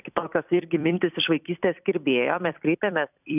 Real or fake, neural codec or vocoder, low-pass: fake; vocoder, 44.1 kHz, 128 mel bands every 256 samples, BigVGAN v2; 3.6 kHz